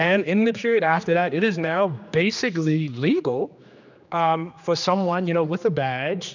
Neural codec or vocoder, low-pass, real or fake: codec, 16 kHz, 2 kbps, X-Codec, HuBERT features, trained on general audio; 7.2 kHz; fake